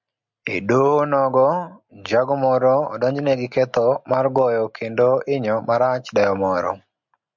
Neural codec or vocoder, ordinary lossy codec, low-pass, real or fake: none; MP3, 64 kbps; 7.2 kHz; real